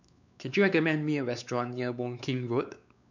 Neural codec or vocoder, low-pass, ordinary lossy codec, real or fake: codec, 16 kHz, 4 kbps, X-Codec, WavLM features, trained on Multilingual LibriSpeech; 7.2 kHz; none; fake